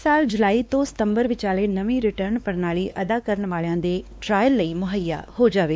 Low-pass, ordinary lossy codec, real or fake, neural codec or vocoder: none; none; fake; codec, 16 kHz, 2 kbps, X-Codec, WavLM features, trained on Multilingual LibriSpeech